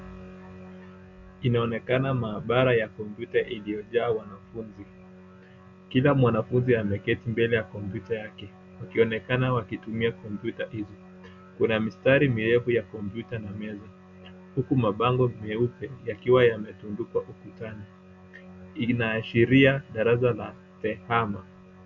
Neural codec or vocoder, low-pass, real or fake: none; 7.2 kHz; real